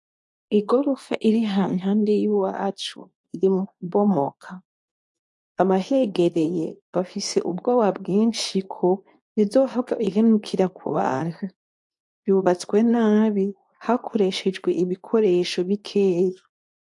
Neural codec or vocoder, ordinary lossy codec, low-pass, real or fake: codec, 24 kHz, 0.9 kbps, WavTokenizer, medium speech release version 2; AAC, 64 kbps; 10.8 kHz; fake